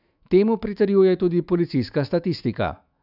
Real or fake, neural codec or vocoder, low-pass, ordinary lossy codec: fake; autoencoder, 48 kHz, 128 numbers a frame, DAC-VAE, trained on Japanese speech; 5.4 kHz; none